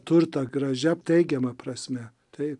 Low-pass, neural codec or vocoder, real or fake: 10.8 kHz; none; real